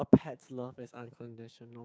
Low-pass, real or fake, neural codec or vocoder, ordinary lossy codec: none; fake; codec, 16 kHz, 6 kbps, DAC; none